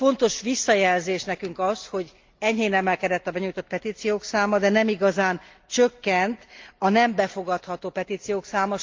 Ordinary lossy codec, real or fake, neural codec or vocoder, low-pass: Opus, 32 kbps; real; none; 7.2 kHz